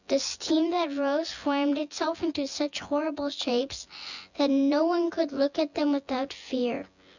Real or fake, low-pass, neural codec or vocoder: fake; 7.2 kHz; vocoder, 24 kHz, 100 mel bands, Vocos